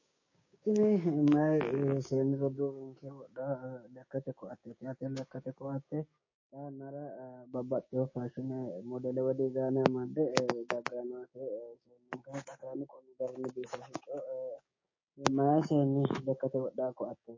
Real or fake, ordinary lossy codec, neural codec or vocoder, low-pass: fake; MP3, 32 kbps; codec, 16 kHz, 6 kbps, DAC; 7.2 kHz